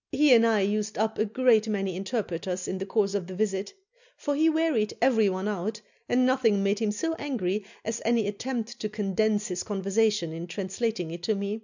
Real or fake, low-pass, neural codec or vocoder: real; 7.2 kHz; none